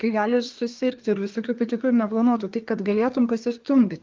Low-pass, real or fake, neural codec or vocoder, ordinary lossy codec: 7.2 kHz; fake; codec, 24 kHz, 1 kbps, SNAC; Opus, 24 kbps